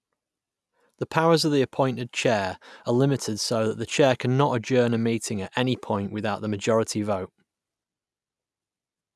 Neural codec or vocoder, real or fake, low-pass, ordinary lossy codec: none; real; none; none